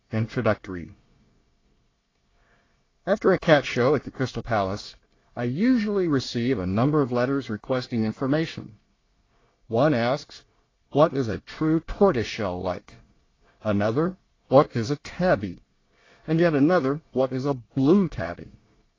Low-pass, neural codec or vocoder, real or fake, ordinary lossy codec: 7.2 kHz; codec, 24 kHz, 1 kbps, SNAC; fake; AAC, 32 kbps